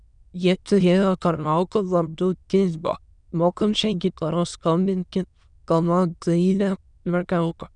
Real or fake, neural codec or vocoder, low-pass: fake; autoencoder, 22.05 kHz, a latent of 192 numbers a frame, VITS, trained on many speakers; 9.9 kHz